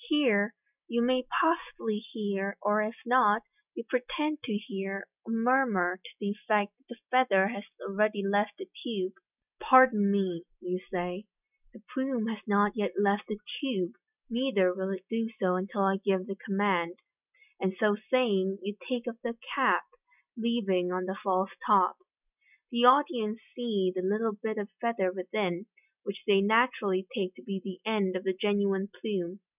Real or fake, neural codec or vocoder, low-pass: real; none; 3.6 kHz